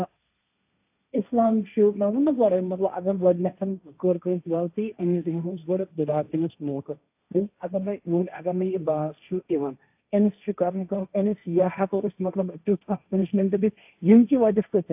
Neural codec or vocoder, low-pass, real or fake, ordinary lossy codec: codec, 16 kHz, 1.1 kbps, Voila-Tokenizer; 3.6 kHz; fake; AAC, 32 kbps